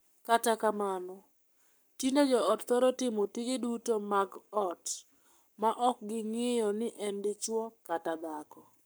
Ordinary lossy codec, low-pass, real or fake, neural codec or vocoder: none; none; fake; codec, 44.1 kHz, 7.8 kbps, Pupu-Codec